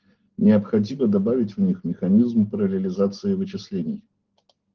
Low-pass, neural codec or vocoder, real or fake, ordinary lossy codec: 7.2 kHz; none; real; Opus, 16 kbps